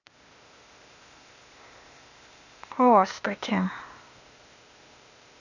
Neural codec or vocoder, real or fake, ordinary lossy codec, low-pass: codec, 16 kHz, 0.8 kbps, ZipCodec; fake; none; 7.2 kHz